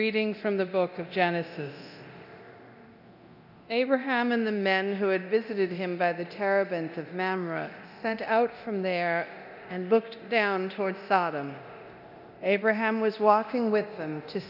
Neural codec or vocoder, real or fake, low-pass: codec, 24 kHz, 0.9 kbps, DualCodec; fake; 5.4 kHz